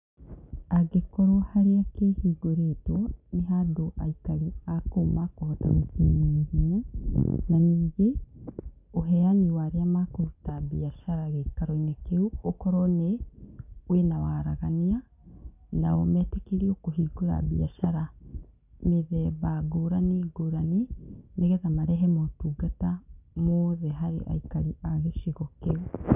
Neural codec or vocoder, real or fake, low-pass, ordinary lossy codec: none; real; 3.6 kHz; none